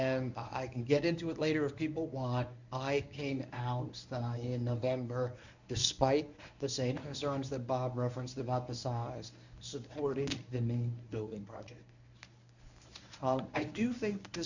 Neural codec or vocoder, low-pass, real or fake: codec, 24 kHz, 0.9 kbps, WavTokenizer, medium speech release version 1; 7.2 kHz; fake